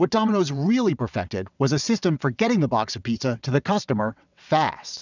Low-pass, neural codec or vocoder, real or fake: 7.2 kHz; vocoder, 22.05 kHz, 80 mel bands, WaveNeXt; fake